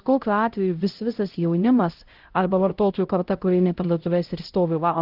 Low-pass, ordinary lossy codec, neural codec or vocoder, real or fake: 5.4 kHz; Opus, 16 kbps; codec, 16 kHz, 0.5 kbps, X-Codec, HuBERT features, trained on LibriSpeech; fake